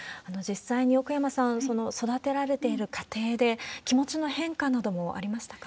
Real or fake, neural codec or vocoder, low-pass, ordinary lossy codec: real; none; none; none